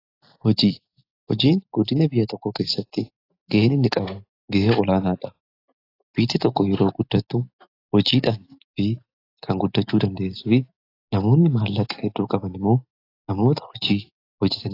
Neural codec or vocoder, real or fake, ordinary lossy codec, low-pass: none; real; AAC, 32 kbps; 5.4 kHz